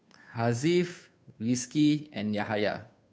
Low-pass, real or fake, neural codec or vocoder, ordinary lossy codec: none; fake; codec, 16 kHz, 2 kbps, FunCodec, trained on Chinese and English, 25 frames a second; none